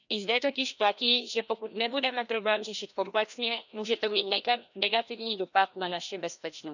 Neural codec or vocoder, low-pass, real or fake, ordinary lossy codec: codec, 16 kHz, 1 kbps, FreqCodec, larger model; 7.2 kHz; fake; none